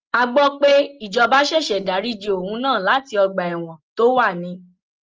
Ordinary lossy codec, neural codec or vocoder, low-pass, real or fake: Opus, 24 kbps; none; 7.2 kHz; real